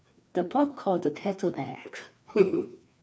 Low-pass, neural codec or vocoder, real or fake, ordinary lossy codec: none; codec, 16 kHz, 2 kbps, FreqCodec, larger model; fake; none